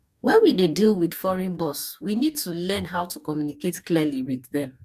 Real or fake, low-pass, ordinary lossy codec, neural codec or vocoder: fake; 14.4 kHz; none; codec, 44.1 kHz, 2.6 kbps, DAC